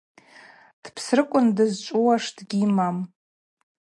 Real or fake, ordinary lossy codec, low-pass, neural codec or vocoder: real; MP3, 48 kbps; 10.8 kHz; none